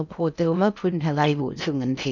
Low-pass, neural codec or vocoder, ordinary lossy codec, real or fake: 7.2 kHz; codec, 16 kHz in and 24 kHz out, 0.6 kbps, FocalCodec, streaming, 2048 codes; none; fake